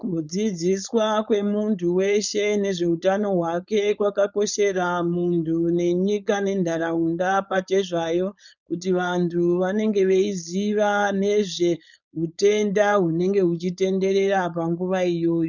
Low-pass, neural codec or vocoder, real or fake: 7.2 kHz; codec, 16 kHz, 4.8 kbps, FACodec; fake